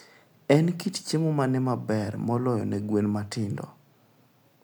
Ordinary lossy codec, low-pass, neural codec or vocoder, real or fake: none; none; none; real